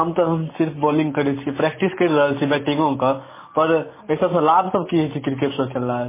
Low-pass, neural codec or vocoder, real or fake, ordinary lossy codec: 3.6 kHz; none; real; MP3, 16 kbps